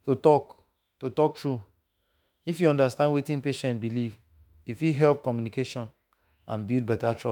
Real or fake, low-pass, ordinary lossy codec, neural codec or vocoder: fake; 19.8 kHz; none; autoencoder, 48 kHz, 32 numbers a frame, DAC-VAE, trained on Japanese speech